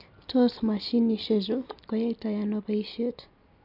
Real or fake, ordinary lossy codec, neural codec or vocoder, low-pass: real; none; none; 5.4 kHz